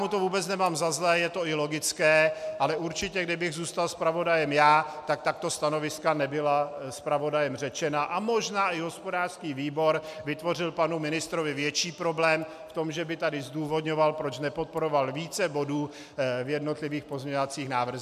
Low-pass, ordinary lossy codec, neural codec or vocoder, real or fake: 14.4 kHz; MP3, 96 kbps; none; real